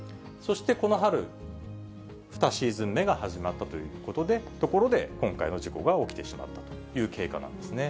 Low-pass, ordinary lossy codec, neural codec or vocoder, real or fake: none; none; none; real